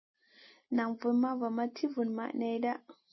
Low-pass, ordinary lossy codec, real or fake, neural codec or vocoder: 7.2 kHz; MP3, 24 kbps; real; none